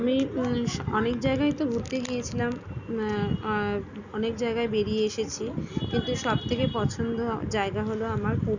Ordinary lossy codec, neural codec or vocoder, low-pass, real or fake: none; none; 7.2 kHz; real